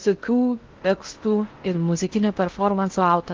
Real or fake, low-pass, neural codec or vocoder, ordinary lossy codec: fake; 7.2 kHz; codec, 16 kHz in and 24 kHz out, 0.6 kbps, FocalCodec, streaming, 2048 codes; Opus, 16 kbps